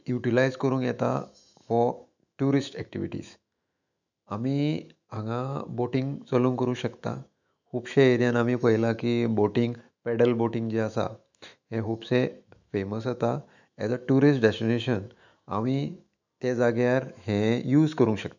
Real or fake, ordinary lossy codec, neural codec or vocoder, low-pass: fake; none; autoencoder, 48 kHz, 128 numbers a frame, DAC-VAE, trained on Japanese speech; 7.2 kHz